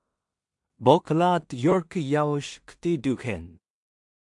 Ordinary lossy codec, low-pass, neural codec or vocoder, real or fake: MP3, 64 kbps; 10.8 kHz; codec, 16 kHz in and 24 kHz out, 0.4 kbps, LongCat-Audio-Codec, two codebook decoder; fake